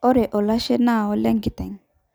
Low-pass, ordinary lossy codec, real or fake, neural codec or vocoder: none; none; real; none